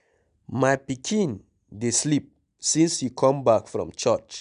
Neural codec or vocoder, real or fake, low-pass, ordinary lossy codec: none; real; 9.9 kHz; none